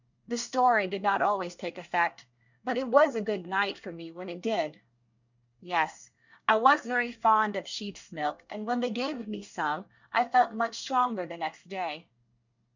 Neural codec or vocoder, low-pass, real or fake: codec, 24 kHz, 1 kbps, SNAC; 7.2 kHz; fake